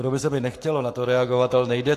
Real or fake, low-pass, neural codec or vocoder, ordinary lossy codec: fake; 14.4 kHz; codec, 44.1 kHz, 7.8 kbps, Pupu-Codec; AAC, 64 kbps